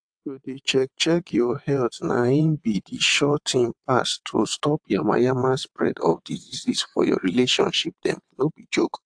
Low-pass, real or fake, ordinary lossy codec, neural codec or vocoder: 9.9 kHz; fake; AAC, 64 kbps; vocoder, 22.05 kHz, 80 mel bands, WaveNeXt